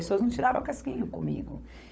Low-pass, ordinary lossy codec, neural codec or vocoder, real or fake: none; none; codec, 16 kHz, 16 kbps, FunCodec, trained on LibriTTS, 50 frames a second; fake